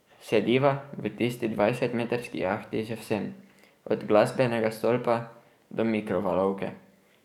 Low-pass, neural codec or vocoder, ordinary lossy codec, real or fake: 19.8 kHz; codec, 44.1 kHz, 7.8 kbps, DAC; none; fake